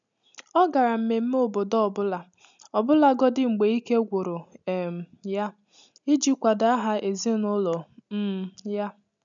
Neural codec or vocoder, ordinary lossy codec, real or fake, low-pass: none; none; real; 7.2 kHz